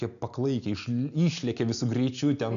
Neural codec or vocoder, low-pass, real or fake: none; 7.2 kHz; real